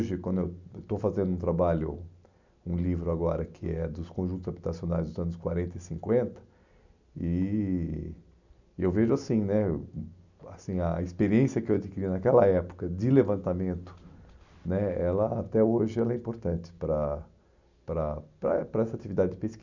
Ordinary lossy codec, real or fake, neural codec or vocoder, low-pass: none; real; none; 7.2 kHz